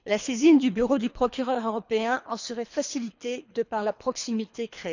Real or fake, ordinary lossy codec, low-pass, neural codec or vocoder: fake; none; 7.2 kHz; codec, 24 kHz, 3 kbps, HILCodec